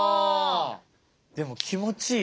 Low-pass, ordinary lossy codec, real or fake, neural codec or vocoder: none; none; real; none